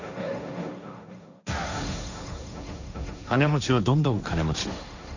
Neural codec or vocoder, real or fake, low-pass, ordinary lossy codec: codec, 16 kHz, 1.1 kbps, Voila-Tokenizer; fake; 7.2 kHz; none